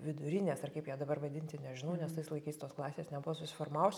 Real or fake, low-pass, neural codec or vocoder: real; 19.8 kHz; none